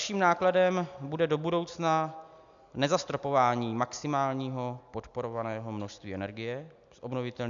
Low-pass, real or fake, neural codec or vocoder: 7.2 kHz; real; none